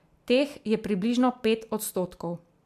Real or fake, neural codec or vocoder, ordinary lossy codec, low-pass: real; none; MP3, 96 kbps; 14.4 kHz